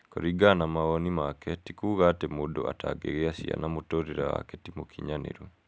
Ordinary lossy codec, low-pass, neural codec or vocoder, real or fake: none; none; none; real